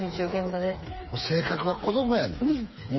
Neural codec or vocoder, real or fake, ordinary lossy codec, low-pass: codec, 16 kHz, 4 kbps, FreqCodec, smaller model; fake; MP3, 24 kbps; 7.2 kHz